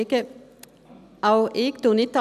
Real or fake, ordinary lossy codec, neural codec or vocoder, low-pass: real; none; none; 14.4 kHz